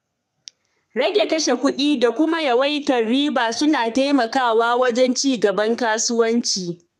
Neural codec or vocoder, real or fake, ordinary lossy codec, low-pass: codec, 32 kHz, 1.9 kbps, SNAC; fake; none; 14.4 kHz